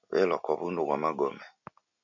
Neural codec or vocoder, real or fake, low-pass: none; real; 7.2 kHz